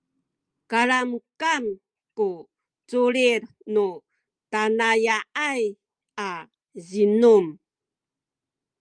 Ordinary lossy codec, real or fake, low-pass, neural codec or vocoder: Opus, 32 kbps; real; 9.9 kHz; none